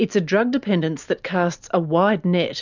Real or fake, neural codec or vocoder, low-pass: real; none; 7.2 kHz